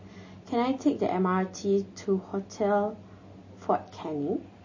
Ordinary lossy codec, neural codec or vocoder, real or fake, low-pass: MP3, 32 kbps; none; real; 7.2 kHz